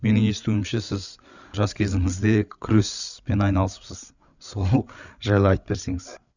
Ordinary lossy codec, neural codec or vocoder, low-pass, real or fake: none; codec, 16 kHz, 8 kbps, FreqCodec, larger model; 7.2 kHz; fake